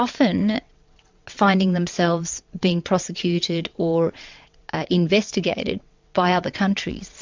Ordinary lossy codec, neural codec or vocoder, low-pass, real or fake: MP3, 64 kbps; vocoder, 22.05 kHz, 80 mel bands, WaveNeXt; 7.2 kHz; fake